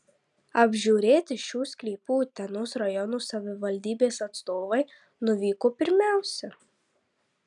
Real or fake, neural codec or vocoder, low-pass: real; none; 10.8 kHz